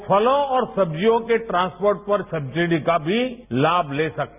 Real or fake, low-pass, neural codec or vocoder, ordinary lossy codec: real; 3.6 kHz; none; none